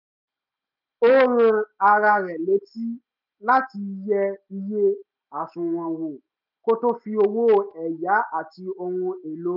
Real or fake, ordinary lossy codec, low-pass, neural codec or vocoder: real; none; 5.4 kHz; none